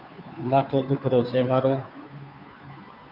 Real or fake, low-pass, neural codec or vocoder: fake; 5.4 kHz; codec, 16 kHz, 2 kbps, FunCodec, trained on Chinese and English, 25 frames a second